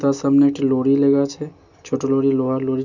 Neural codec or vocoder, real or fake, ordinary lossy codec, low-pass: none; real; none; 7.2 kHz